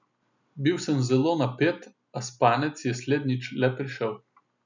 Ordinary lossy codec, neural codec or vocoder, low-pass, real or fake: none; none; 7.2 kHz; real